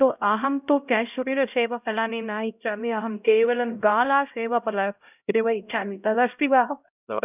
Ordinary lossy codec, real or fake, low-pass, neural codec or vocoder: none; fake; 3.6 kHz; codec, 16 kHz, 0.5 kbps, X-Codec, HuBERT features, trained on LibriSpeech